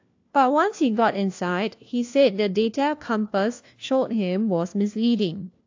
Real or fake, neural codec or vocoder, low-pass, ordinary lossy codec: fake; codec, 16 kHz, 1 kbps, FunCodec, trained on LibriTTS, 50 frames a second; 7.2 kHz; AAC, 48 kbps